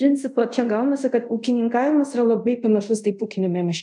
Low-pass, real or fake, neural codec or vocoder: 10.8 kHz; fake; codec, 24 kHz, 0.5 kbps, DualCodec